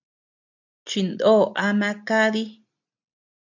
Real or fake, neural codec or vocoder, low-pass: real; none; 7.2 kHz